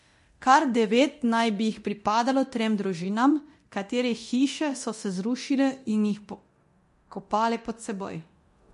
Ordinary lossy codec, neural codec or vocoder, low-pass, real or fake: MP3, 48 kbps; codec, 24 kHz, 0.9 kbps, DualCodec; 10.8 kHz; fake